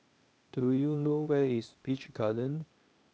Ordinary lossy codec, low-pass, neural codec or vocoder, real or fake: none; none; codec, 16 kHz, 0.8 kbps, ZipCodec; fake